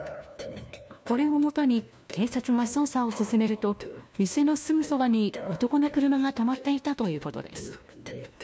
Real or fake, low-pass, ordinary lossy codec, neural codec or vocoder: fake; none; none; codec, 16 kHz, 1 kbps, FunCodec, trained on LibriTTS, 50 frames a second